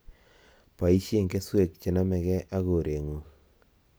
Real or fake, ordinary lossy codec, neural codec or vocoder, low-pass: real; none; none; none